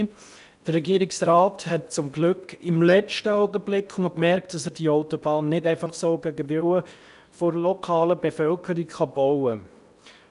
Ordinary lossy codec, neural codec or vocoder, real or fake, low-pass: none; codec, 16 kHz in and 24 kHz out, 0.8 kbps, FocalCodec, streaming, 65536 codes; fake; 10.8 kHz